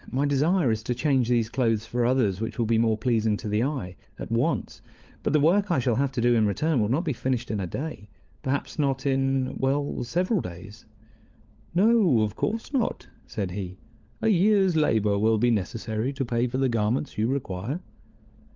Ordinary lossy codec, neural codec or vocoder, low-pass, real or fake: Opus, 32 kbps; codec, 16 kHz, 16 kbps, FunCodec, trained on LibriTTS, 50 frames a second; 7.2 kHz; fake